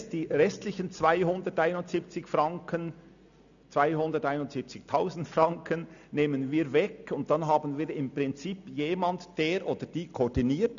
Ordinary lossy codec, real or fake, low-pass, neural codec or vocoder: none; real; 7.2 kHz; none